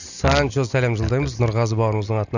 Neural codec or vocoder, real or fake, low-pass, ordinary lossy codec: none; real; 7.2 kHz; none